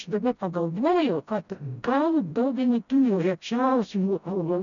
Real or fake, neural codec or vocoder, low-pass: fake; codec, 16 kHz, 0.5 kbps, FreqCodec, smaller model; 7.2 kHz